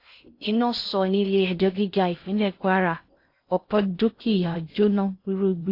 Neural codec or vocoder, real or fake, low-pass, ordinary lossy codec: codec, 16 kHz in and 24 kHz out, 0.6 kbps, FocalCodec, streaming, 4096 codes; fake; 5.4 kHz; AAC, 32 kbps